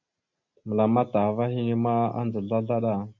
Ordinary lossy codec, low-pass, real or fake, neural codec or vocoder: Opus, 64 kbps; 7.2 kHz; real; none